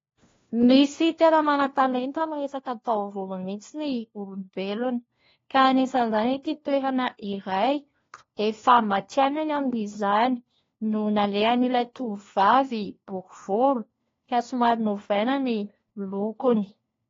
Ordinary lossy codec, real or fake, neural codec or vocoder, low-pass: AAC, 32 kbps; fake; codec, 16 kHz, 1 kbps, FunCodec, trained on LibriTTS, 50 frames a second; 7.2 kHz